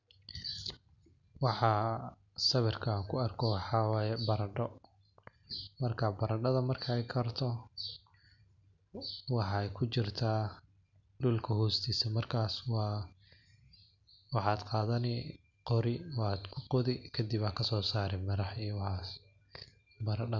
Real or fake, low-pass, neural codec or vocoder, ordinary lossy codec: real; 7.2 kHz; none; none